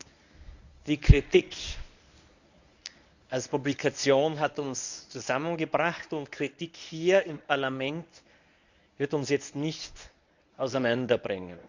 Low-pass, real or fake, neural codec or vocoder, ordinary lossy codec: 7.2 kHz; fake; codec, 24 kHz, 0.9 kbps, WavTokenizer, medium speech release version 1; none